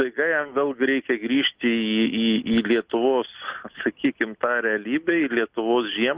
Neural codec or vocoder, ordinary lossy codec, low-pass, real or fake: none; Opus, 32 kbps; 3.6 kHz; real